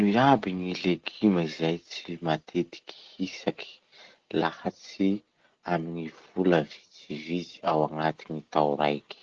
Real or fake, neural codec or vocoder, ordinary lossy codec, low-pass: real; none; Opus, 16 kbps; 7.2 kHz